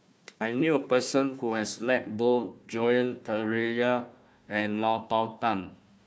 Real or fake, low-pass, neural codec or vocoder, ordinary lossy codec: fake; none; codec, 16 kHz, 1 kbps, FunCodec, trained on Chinese and English, 50 frames a second; none